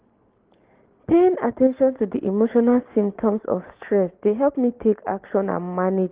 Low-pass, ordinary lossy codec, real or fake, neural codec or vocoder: 3.6 kHz; Opus, 16 kbps; real; none